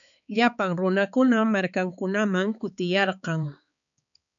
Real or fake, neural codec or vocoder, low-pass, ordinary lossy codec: fake; codec, 16 kHz, 4 kbps, X-Codec, HuBERT features, trained on balanced general audio; 7.2 kHz; MP3, 96 kbps